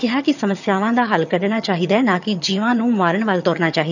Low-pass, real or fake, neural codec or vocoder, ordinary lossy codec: 7.2 kHz; fake; vocoder, 22.05 kHz, 80 mel bands, HiFi-GAN; none